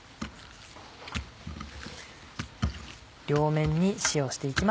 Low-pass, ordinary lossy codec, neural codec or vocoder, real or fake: none; none; none; real